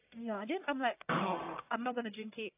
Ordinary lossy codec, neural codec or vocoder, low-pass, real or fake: AAC, 24 kbps; codec, 44.1 kHz, 1.7 kbps, Pupu-Codec; 3.6 kHz; fake